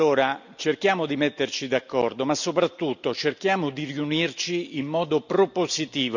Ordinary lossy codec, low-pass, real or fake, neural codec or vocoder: none; 7.2 kHz; real; none